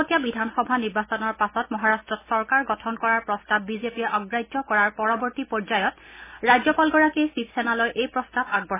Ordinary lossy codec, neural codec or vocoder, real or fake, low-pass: MP3, 16 kbps; none; real; 3.6 kHz